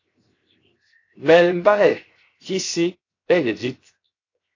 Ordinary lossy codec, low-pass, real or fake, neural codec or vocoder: AAC, 32 kbps; 7.2 kHz; fake; codec, 16 kHz, 0.7 kbps, FocalCodec